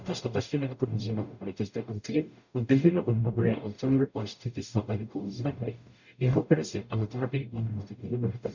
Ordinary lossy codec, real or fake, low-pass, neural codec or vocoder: none; fake; 7.2 kHz; codec, 44.1 kHz, 0.9 kbps, DAC